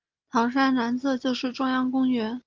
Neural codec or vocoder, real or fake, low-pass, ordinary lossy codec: none; real; 7.2 kHz; Opus, 32 kbps